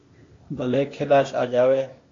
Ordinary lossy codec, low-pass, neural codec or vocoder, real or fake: AAC, 32 kbps; 7.2 kHz; codec, 16 kHz, 0.8 kbps, ZipCodec; fake